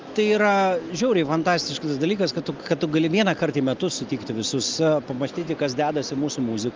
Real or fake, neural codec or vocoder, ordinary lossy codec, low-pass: real; none; Opus, 32 kbps; 7.2 kHz